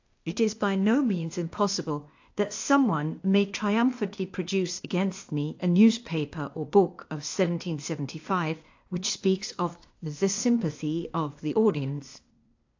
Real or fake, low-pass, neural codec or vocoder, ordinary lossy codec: fake; 7.2 kHz; codec, 16 kHz, 0.8 kbps, ZipCodec; AAC, 48 kbps